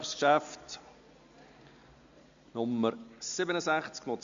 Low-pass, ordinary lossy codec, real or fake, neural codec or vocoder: 7.2 kHz; MP3, 64 kbps; real; none